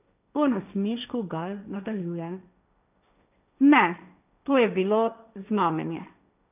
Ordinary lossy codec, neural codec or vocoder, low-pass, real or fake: none; codec, 16 kHz, 1.1 kbps, Voila-Tokenizer; 3.6 kHz; fake